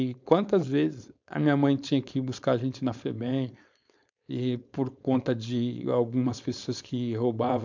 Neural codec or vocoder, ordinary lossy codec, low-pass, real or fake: codec, 16 kHz, 4.8 kbps, FACodec; MP3, 64 kbps; 7.2 kHz; fake